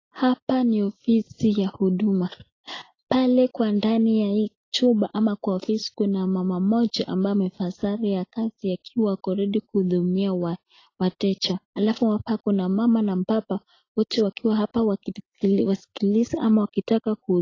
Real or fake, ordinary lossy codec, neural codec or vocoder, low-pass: real; AAC, 32 kbps; none; 7.2 kHz